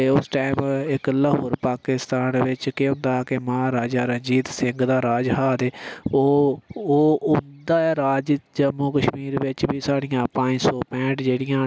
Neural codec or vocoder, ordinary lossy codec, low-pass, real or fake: none; none; none; real